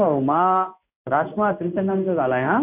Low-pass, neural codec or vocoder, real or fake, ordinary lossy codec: 3.6 kHz; codec, 16 kHz in and 24 kHz out, 1 kbps, XY-Tokenizer; fake; none